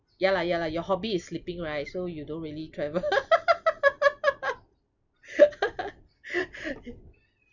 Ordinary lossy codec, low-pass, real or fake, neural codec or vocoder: none; 7.2 kHz; fake; vocoder, 44.1 kHz, 128 mel bands every 256 samples, BigVGAN v2